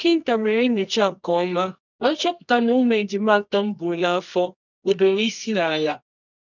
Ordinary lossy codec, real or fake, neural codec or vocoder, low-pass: none; fake; codec, 24 kHz, 0.9 kbps, WavTokenizer, medium music audio release; 7.2 kHz